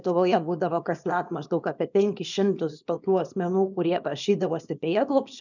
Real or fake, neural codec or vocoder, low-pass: fake; codec, 24 kHz, 0.9 kbps, WavTokenizer, small release; 7.2 kHz